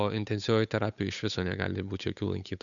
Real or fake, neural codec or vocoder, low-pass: fake; codec, 16 kHz, 8 kbps, FunCodec, trained on Chinese and English, 25 frames a second; 7.2 kHz